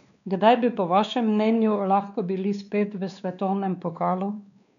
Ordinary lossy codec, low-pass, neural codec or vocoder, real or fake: none; 7.2 kHz; codec, 16 kHz, 2 kbps, X-Codec, WavLM features, trained on Multilingual LibriSpeech; fake